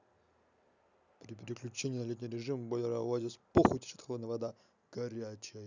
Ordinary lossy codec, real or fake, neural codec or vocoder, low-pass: none; real; none; 7.2 kHz